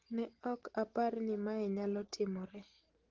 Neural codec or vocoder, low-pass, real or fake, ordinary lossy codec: none; 7.2 kHz; real; Opus, 16 kbps